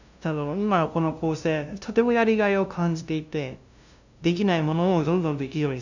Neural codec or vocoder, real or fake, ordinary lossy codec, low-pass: codec, 16 kHz, 0.5 kbps, FunCodec, trained on LibriTTS, 25 frames a second; fake; none; 7.2 kHz